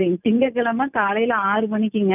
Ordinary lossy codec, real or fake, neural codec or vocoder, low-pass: none; real; none; 3.6 kHz